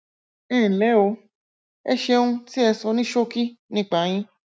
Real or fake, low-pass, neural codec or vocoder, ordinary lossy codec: real; none; none; none